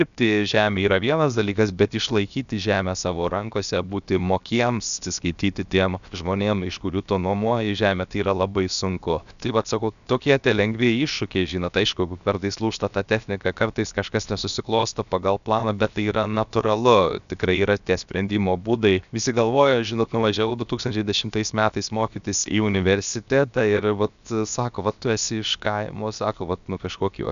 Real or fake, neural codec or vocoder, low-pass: fake; codec, 16 kHz, 0.7 kbps, FocalCodec; 7.2 kHz